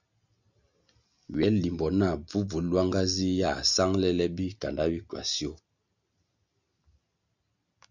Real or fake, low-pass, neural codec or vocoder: real; 7.2 kHz; none